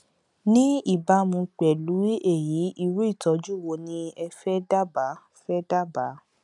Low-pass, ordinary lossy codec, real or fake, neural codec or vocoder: 10.8 kHz; none; real; none